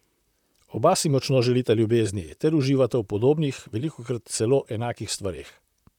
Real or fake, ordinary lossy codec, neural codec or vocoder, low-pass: fake; none; vocoder, 44.1 kHz, 128 mel bands, Pupu-Vocoder; 19.8 kHz